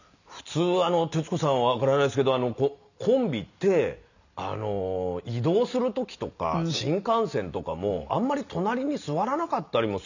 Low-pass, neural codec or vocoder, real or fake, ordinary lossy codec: 7.2 kHz; none; real; none